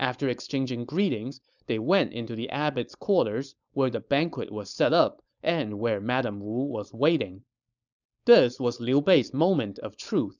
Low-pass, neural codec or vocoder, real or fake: 7.2 kHz; codec, 16 kHz, 4.8 kbps, FACodec; fake